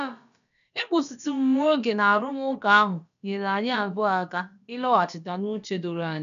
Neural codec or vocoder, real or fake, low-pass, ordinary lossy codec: codec, 16 kHz, about 1 kbps, DyCAST, with the encoder's durations; fake; 7.2 kHz; none